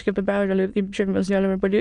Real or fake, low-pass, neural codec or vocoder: fake; 9.9 kHz; autoencoder, 22.05 kHz, a latent of 192 numbers a frame, VITS, trained on many speakers